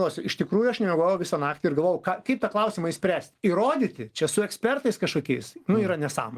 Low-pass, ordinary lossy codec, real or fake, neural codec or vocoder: 14.4 kHz; Opus, 24 kbps; real; none